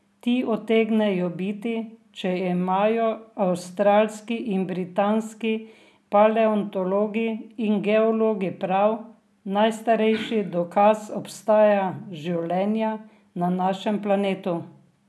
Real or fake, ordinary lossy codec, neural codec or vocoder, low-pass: real; none; none; none